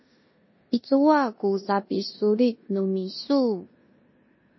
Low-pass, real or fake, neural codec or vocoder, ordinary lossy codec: 7.2 kHz; fake; codec, 16 kHz in and 24 kHz out, 0.9 kbps, LongCat-Audio-Codec, four codebook decoder; MP3, 24 kbps